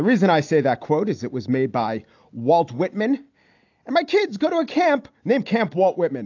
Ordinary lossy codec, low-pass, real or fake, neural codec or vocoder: AAC, 48 kbps; 7.2 kHz; real; none